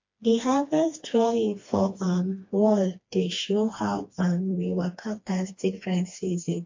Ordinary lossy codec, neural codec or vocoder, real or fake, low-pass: MP3, 48 kbps; codec, 16 kHz, 2 kbps, FreqCodec, smaller model; fake; 7.2 kHz